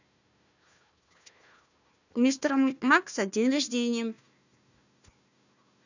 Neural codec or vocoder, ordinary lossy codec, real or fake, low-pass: codec, 16 kHz, 1 kbps, FunCodec, trained on Chinese and English, 50 frames a second; none; fake; 7.2 kHz